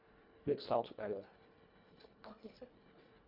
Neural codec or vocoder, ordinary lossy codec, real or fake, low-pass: codec, 24 kHz, 1.5 kbps, HILCodec; none; fake; 5.4 kHz